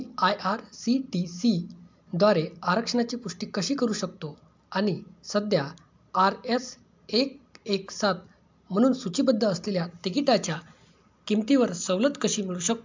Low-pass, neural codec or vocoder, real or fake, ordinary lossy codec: 7.2 kHz; none; real; MP3, 64 kbps